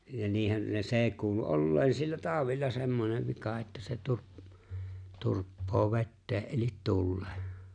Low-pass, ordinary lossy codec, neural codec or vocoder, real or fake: 9.9 kHz; none; none; real